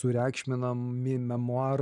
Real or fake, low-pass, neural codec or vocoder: real; 10.8 kHz; none